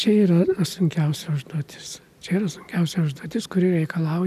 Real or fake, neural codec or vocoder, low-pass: real; none; 14.4 kHz